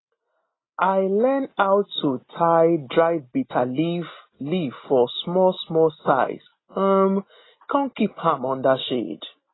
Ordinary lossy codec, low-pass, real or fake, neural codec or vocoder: AAC, 16 kbps; 7.2 kHz; real; none